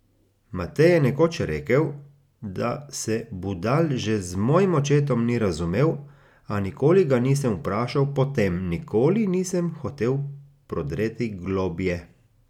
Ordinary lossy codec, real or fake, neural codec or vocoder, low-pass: none; real; none; 19.8 kHz